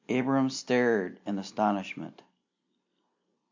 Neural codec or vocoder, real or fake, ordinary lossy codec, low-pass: none; real; MP3, 64 kbps; 7.2 kHz